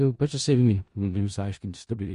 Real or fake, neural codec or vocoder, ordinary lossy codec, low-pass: fake; codec, 16 kHz in and 24 kHz out, 0.4 kbps, LongCat-Audio-Codec, four codebook decoder; MP3, 48 kbps; 10.8 kHz